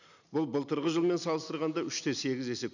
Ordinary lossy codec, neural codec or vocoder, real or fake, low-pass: none; none; real; 7.2 kHz